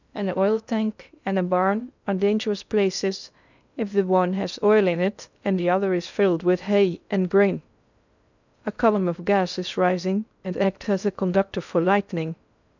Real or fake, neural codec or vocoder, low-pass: fake; codec, 16 kHz in and 24 kHz out, 0.6 kbps, FocalCodec, streaming, 2048 codes; 7.2 kHz